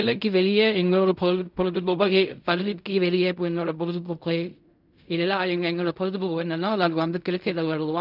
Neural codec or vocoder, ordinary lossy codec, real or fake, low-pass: codec, 16 kHz in and 24 kHz out, 0.4 kbps, LongCat-Audio-Codec, fine tuned four codebook decoder; none; fake; 5.4 kHz